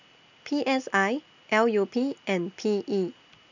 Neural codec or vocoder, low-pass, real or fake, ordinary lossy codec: none; 7.2 kHz; real; none